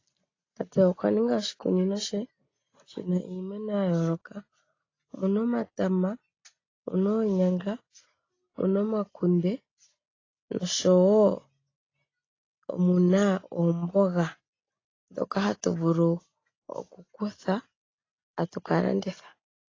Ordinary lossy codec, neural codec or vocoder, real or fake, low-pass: AAC, 32 kbps; none; real; 7.2 kHz